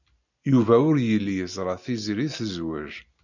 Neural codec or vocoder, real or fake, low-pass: none; real; 7.2 kHz